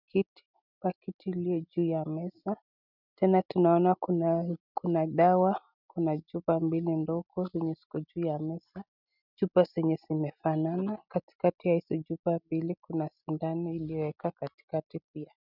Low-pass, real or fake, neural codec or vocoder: 5.4 kHz; real; none